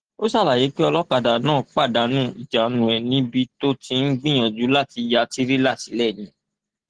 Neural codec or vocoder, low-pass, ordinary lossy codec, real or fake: none; 9.9 kHz; Opus, 16 kbps; real